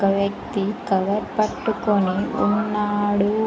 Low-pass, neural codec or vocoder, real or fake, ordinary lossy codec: none; none; real; none